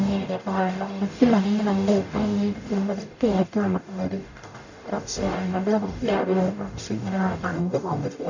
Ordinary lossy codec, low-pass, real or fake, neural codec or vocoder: none; 7.2 kHz; fake; codec, 44.1 kHz, 0.9 kbps, DAC